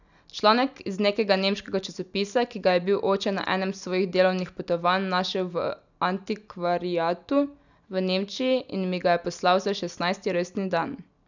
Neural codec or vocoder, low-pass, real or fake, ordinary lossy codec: none; 7.2 kHz; real; none